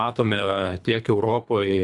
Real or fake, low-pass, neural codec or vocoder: fake; 10.8 kHz; codec, 24 kHz, 3 kbps, HILCodec